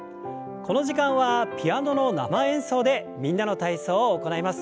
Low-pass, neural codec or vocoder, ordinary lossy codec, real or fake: none; none; none; real